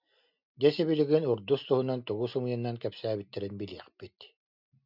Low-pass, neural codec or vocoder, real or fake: 5.4 kHz; none; real